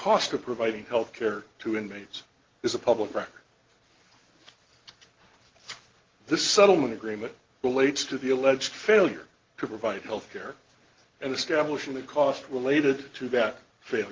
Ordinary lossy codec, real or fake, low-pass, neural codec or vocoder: Opus, 16 kbps; real; 7.2 kHz; none